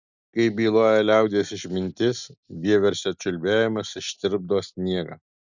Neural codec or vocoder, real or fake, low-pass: none; real; 7.2 kHz